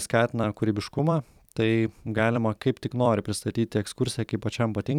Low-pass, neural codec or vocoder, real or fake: 19.8 kHz; vocoder, 44.1 kHz, 128 mel bands every 256 samples, BigVGAN v2; fake